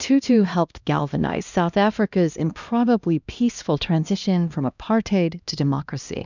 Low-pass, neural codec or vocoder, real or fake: 7.2 kHz; codec, 16 kHz, 1 kbps, X-Codec, HuBERT features, trained on LibriSpeech; fake